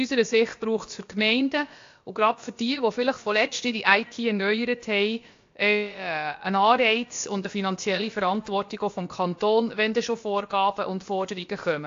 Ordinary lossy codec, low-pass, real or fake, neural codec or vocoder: AAC, 48 kbps; 7.2 kHz; fake; codec, 16 kHz, about 1 kbps, DyCAST, with the encoder's durations